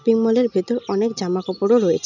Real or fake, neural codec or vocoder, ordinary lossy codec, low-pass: real; none; none; 7.2 kHz